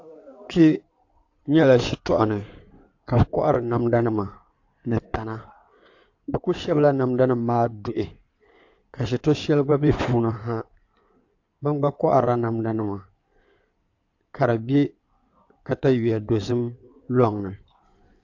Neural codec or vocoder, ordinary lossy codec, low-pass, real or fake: codec, 16 kHz in and 24 kHz out, 2.2 kbps, FireRedTTS-2 codec; AAC, 48 kbps; 7.2 kHz; fake